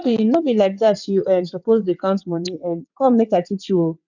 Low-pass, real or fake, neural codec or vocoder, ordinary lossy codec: 7.2 kHz; fake; vocoder, 22.05 kHz, 80 mel bands, WaveNeXt; none